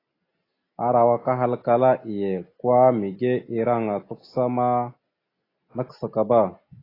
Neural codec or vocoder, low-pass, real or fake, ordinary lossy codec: none; 5.4 kHz; real; AAC, 24 kbps